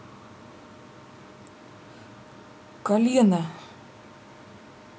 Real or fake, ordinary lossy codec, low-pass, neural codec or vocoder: real; none; none; none